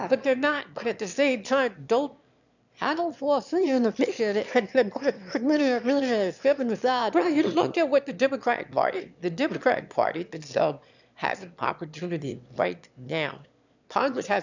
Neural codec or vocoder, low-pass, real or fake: autoencoder, 22.05 kHz, a latent of 192 numbers a frame, VITS, trained on one speaker; 7.2 kHz; fake